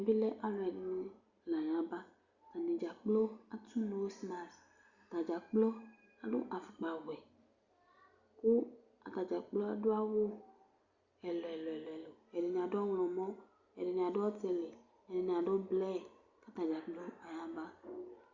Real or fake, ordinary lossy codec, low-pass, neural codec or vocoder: real; Opus, 64 kbps; 7.2 kHz; none